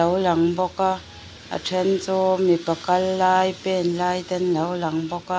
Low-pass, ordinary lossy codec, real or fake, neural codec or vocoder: none; none; real; none